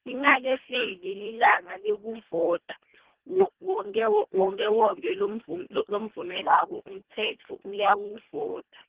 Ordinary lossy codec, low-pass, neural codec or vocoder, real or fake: Opus, 16 kbps; 3.6 kHz; codec, 24 kHz, 1.5 kbps, HILCodec; fake